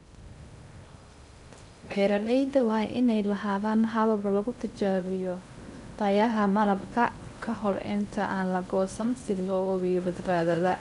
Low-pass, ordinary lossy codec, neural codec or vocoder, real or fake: 10.8 kHz; none; codec, 16 kHz in and 24 kHz out, 0.6 kbps, FocalCodec, streaming, 2048 codes; fake